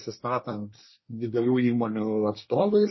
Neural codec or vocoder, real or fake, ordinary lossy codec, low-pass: codec, 24 kHz, 1 kbps, SNAC; fake; MP3, 24 kbps; 7.2 kHz